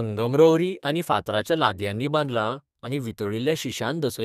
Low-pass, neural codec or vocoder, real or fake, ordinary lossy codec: 14.4 kHz; codec, 32 kHz, 1.9 kbps, SNAC; fake; none